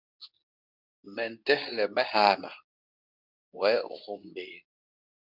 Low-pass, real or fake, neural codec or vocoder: 5.4 kHz; fake; codec, 24 kHz, 0.9 kbps, WavTokenizer, medium speech release version 2